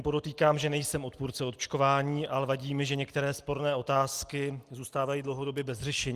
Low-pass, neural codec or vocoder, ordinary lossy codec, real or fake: 14.4 kHz; none; Opus, 24 kbps; real